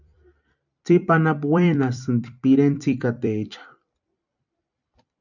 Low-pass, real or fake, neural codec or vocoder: 7.2 kHz; fake; vocoder, 44.1 kHz, 128 mel bands every 256 samples, BigVGAN v2